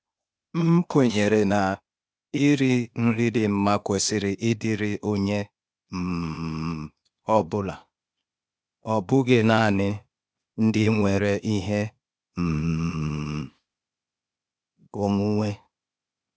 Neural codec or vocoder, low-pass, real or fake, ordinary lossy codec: codec, 16 kHz, 0.8 kbps, ZipCodec; none; fake; none